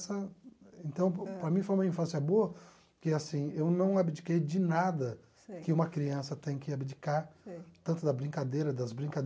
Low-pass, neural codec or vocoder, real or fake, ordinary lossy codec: none; none; real; none